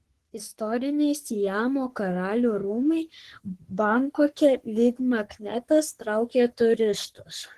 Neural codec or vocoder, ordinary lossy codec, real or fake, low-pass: codec, 44.1 kHz, 3.4 kbps, Pupu-Codec; Opus, 16 kbps; fake; 14.4 kHz